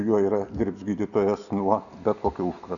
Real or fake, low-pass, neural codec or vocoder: real; 7.2 kHz; none